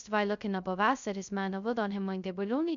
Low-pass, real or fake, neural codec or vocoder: 7.2 kHz; fake; codec, 16 kHz, 0.2 kbps, FocalCodec